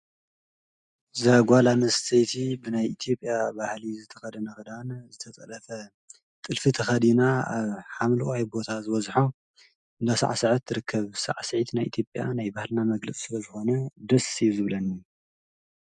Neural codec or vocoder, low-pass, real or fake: none; 10.8 kHz; real